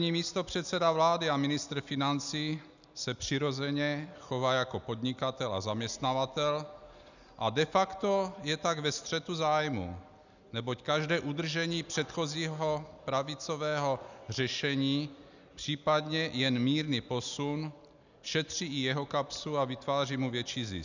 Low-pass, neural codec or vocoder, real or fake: 7.2 kHz; none; real